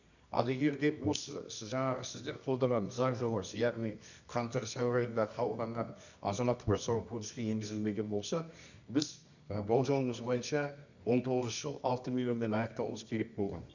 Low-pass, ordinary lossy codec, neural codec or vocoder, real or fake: 7.2 kHz; none; codec, 24 kHz, 0.9 kbps, WavTokenizer, medium music audio release; fake